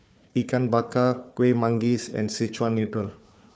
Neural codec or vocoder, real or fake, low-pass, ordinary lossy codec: codec, 16 kHz, 4 kbps, FunCodec, trained on Chinese and English, 50 frames a second; fake; none; none